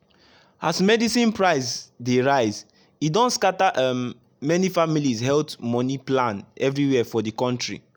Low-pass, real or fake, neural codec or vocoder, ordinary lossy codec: none; real; none; none